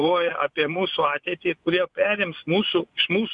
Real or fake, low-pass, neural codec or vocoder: fake; 10.8 kHz; vocoder, 24 kHz, 100 mel bands, Vocos